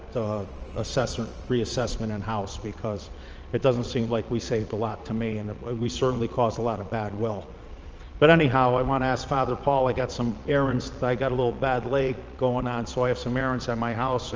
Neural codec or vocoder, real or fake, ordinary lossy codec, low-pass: vocoder, 22.05 kHz, 80 mel bands, Vocos; fake; Opus, 24 kbps; 7.2 kHz